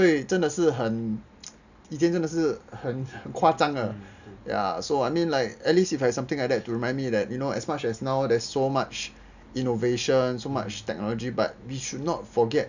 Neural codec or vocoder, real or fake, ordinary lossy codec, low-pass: none; real; none; 7.2 kHz